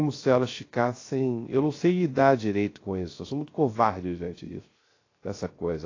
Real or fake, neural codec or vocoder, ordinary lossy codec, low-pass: fake; codec, 16 kHz, 0.3 kbps, FocalCodec; AAC, 32 kbps; 7.2 kHz